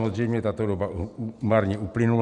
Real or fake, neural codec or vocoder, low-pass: real; none; 10.8 kHz